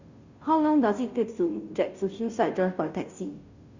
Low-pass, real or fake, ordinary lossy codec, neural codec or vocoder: 7.2 kHz; fake; Opus, 64 kbps; codec, 16 kHz, 0.5 kbps, FunCodec, trained on Chinese and English, 25 frames a second